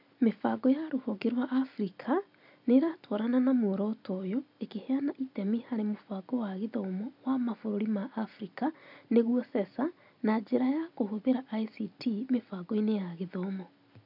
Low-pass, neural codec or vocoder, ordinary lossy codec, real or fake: 5.4 kHz; none; none; real